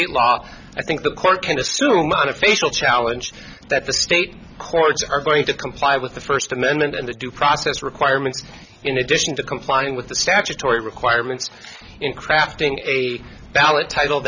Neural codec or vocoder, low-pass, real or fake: none; 7.2 kHz; real